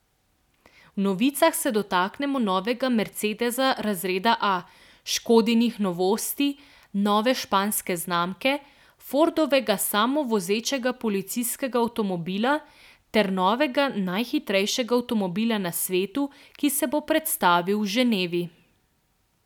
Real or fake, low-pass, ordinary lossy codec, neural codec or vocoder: real; 19.8 kHz; none; none